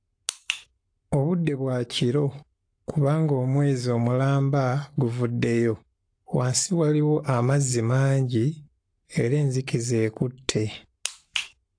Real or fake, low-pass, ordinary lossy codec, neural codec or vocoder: fake; 9.9 kHz; AAC, 48 kbps; codec, 44.1 kHz, 7.8 kbps, Pupu-Codec